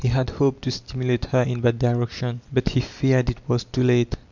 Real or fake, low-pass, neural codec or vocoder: real; 7.2 kHz; none